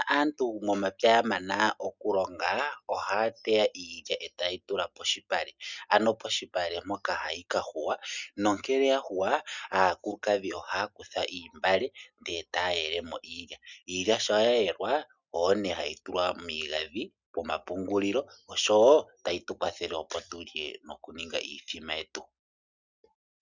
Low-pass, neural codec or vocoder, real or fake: 7.2 kHz; none; real